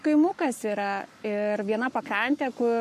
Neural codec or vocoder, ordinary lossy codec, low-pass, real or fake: codec, 44.1 kHz, 7.8 kbps, Pupu-Codec; MP3, 64 kbps; 14.4 kHz; fake